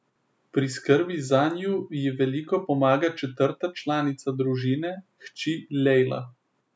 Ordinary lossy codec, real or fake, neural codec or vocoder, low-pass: none; real; none; none